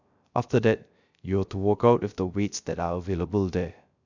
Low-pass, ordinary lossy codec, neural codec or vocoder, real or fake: 7.2 kHz; none; codec, 16 kHz, 0.3 kbps, FocalCodec; fake